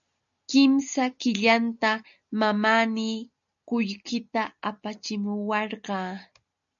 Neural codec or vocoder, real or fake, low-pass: none; real; 7.2 kHz